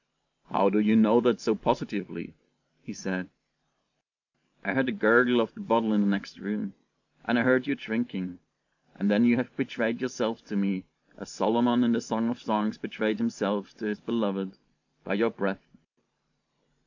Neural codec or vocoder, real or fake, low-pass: vocoder, 44.1 kHz, 128 mel bands every 512 samples, BigVGAN v2; fake; 7.2 kHz